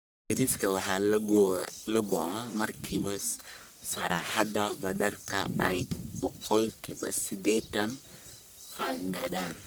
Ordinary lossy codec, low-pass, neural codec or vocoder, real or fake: none; none; codec, 44.1 kHz, 1.7 kbps, Pupu-Codec; fake